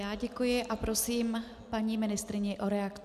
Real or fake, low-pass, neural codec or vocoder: real; 14.4 kHz; none